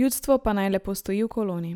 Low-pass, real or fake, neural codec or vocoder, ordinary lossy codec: none; real; none; none